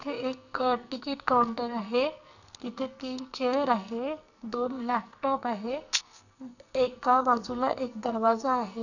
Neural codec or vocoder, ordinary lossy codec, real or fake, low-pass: codec, 44.1 kHz, 2.6 kbps, SNAC; none; fake; 7.2 kHz